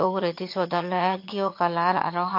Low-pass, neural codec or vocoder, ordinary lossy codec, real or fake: 5.4 kHz; vocoder, 22.05 kHz, 80 mel bands, HiFi-GAN; MP3, 32 kbps; fake